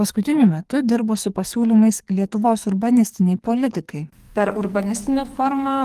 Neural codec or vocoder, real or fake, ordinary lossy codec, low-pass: codec, 44.1 kHz, 2.6 kbps, SNAC; fake; Opus, 24 kbps; 14.4 kHz